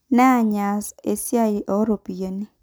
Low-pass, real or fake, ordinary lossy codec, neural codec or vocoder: none; real; none; none